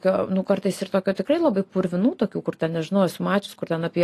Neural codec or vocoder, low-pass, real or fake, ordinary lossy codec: none; 14.4 kHz; real; AAC, 48 kbps